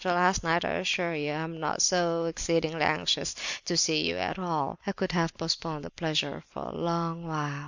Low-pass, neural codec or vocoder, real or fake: 7.2 kHz; vocoder, 44.1 kHz, 128 mel bands every 256 samples, BigVGAN v2; fake